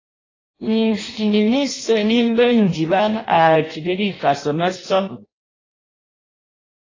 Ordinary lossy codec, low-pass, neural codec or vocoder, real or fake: AAC, 32 kbps; 7.2 kHz; codec, 16 kHz in and 24 kHz out, 0.6 kbps, FireRedTTS-2 codec; fake